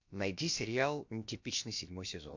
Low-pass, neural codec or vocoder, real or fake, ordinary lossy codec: 7.2 kHz; codec, 16 kHz, about 1 kbps, DyCAST, with the encoder's durations; fake; MP3, 48 kbps